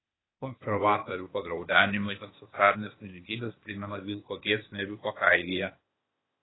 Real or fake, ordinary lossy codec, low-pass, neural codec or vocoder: fake; AAC, 16 kbps; 7.2 kHz; codec, 16 kHz, 0.8 kbps, ZipCodec